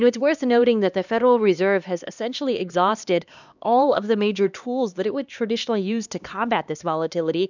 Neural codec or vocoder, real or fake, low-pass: codec, 16 kHz, 2 kbps, X-Codec, HuBERT features, trained on LibriSpeech; fake; 7.2 kHz